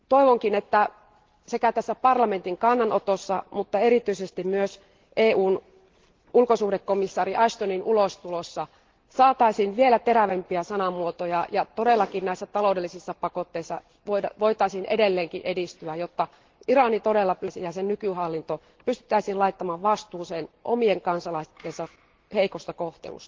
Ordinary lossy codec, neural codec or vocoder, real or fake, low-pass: Opus, 16 kbps; none; real; 7.2 kHz